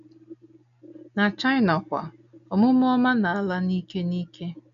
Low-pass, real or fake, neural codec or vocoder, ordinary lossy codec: 7.2 kHz; real; none; none